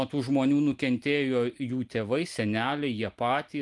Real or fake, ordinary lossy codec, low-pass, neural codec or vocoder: real; Opus, 24 kbps; 10.8 kHz; none